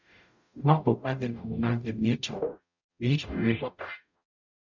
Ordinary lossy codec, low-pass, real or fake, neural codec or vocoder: none; 7.2 kHz; fake; codec, 44.1 kHz, 0.9 kbps, DAC